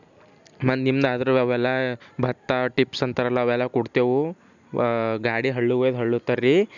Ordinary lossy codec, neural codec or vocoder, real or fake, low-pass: none; none; real; 7.2 kHz